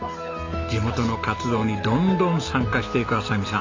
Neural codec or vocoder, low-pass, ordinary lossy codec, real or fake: none; 7.2 kHz; MP3, 48 kbps; real